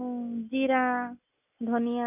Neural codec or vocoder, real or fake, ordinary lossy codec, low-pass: none; real; none; 3.6 kHz